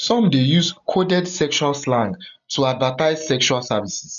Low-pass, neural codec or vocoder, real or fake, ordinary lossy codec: 7.2 kHz; none; real; none